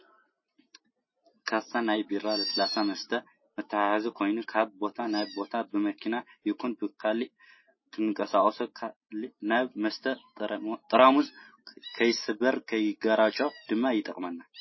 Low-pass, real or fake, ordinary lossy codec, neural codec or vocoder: 7.2 kHz; real; MP3, 24 kbps; none